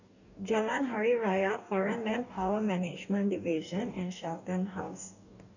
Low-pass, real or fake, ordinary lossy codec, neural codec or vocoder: 7.2 kHz; fake; none; codec, 44.1 kHz, 2.6 kbps, DAC